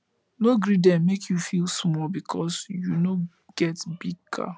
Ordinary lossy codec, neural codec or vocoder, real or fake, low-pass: none; none; real; none